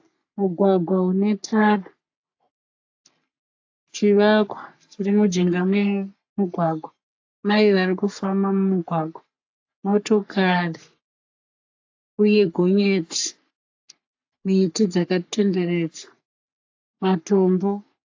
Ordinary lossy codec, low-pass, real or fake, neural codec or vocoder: AAC, 48 kbps; 7.2 kHz; fake; codec, 44.1 kHz, 3.4 kbps, Pupu-Codec